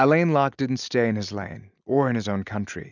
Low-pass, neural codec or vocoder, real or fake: 7.2 kHz; none; real